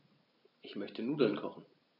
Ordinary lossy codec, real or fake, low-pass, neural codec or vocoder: none; real; 5.4 kHz; none